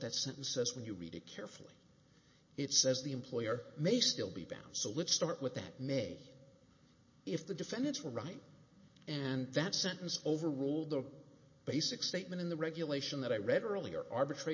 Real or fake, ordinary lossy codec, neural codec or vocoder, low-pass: real; MP3, 32 kbps; none; 7.2 kHz